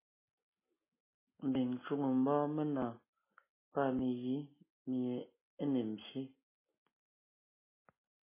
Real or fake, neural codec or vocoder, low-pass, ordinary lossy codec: real; none; 3.6 kHz; MP3, 16 kbps